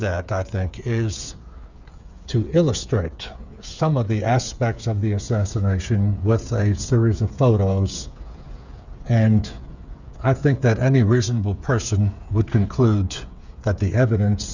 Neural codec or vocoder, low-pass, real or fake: codec, 16 kHz, 8 kbps, FreqCodec, smaller model; 7.2 kHz; fake